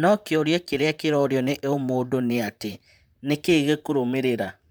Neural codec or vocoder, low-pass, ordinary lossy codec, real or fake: vocoder, 44.1 kHz, 128 mel bands, Pupu-Vocoder; none; none; fake